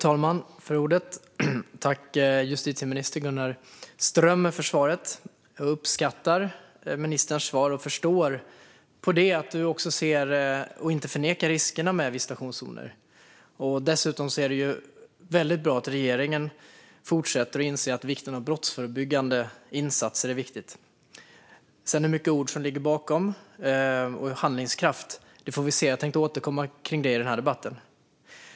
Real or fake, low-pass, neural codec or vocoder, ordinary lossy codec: real; none; none; none